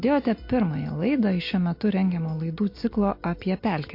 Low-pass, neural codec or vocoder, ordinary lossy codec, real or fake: 5.4 kHz; none; MP3, 32 kbps; real